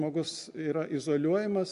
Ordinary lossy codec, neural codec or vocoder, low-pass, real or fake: MP3, 64 kbps; none; 10.8 kHz; real